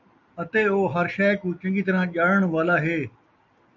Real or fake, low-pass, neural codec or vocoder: real; 7.2 kHz; none